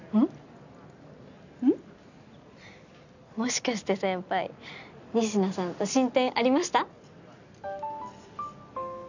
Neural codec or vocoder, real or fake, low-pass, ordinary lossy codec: none; real; 7.2 kHz; none